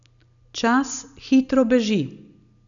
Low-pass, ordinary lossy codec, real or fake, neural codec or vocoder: 7.2 kHz; none; real; none